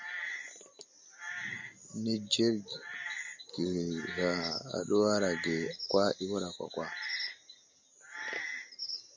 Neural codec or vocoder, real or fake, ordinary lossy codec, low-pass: none; real; MP3, 64 kbps; 7.2 kHz